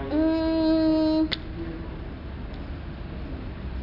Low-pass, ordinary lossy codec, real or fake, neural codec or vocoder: 5.4 kHz; none; real; none